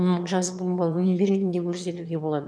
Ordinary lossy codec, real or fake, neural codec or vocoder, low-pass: none; fake; autoencoder, 22.05 kHz, a latent of 192 numbers a frame, VITS, trained on one speaker; none